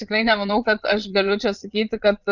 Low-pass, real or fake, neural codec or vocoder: 7.2 kHz; fake; vocoder, 22.05 kHz, 80 mel bands, Vocos